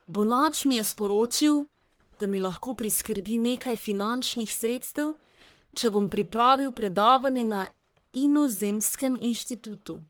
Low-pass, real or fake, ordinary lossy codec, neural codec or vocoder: none; fake; none; codec, 44.1 kHz, 1.7 kbps, Pupu-Codec